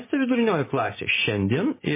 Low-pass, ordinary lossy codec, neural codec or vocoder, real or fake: 3.6 kHz; MP3, 16 kbps; none; real